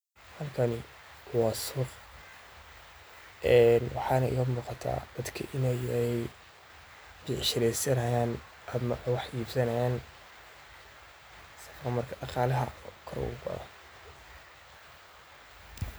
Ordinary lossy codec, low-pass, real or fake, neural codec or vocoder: none; none; real; none